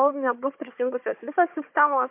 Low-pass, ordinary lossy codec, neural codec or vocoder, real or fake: 3.6 kHz; MP3, 32 kbps; codec, 16 kHz, 4 kbps, FunCodec, trained on Chinese and English, 50 frames a second; fake